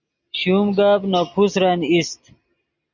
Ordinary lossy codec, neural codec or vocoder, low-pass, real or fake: Opus, 64 kbps; none; 7.2 kHz; real